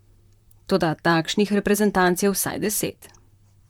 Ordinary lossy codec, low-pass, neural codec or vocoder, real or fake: MP3, 96 kbps; 19.8 kHz; vocoder, 44.1 kHz, 128 mel bands, Pupu-Vocoder; fake